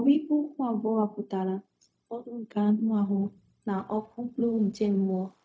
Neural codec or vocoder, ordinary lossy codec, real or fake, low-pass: codec, 16 kHz, 0.4 kbps, LongCat-Audio-Codec; none; fake; none